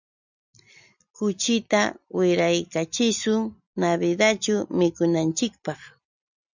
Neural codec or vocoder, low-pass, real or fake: none; 7.2 kHz; real